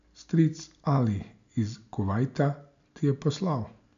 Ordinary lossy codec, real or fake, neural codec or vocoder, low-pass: AAC, 64 kbps; real; none; 7.2 kHz